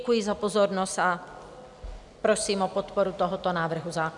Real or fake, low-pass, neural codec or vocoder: real; 10.8 kHz; none